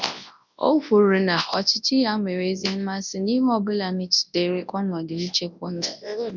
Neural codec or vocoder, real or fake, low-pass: codec, 24 kHz, 0.9 kbps, WavTokenizer, large speech release; fake; 7.2 kHz